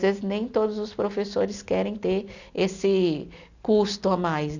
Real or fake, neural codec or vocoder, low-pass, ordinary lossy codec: real; none; 7.2 kHz; none